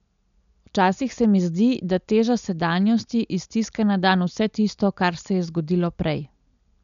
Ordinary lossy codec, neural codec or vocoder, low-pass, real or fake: none; none; 7.2 kHz; real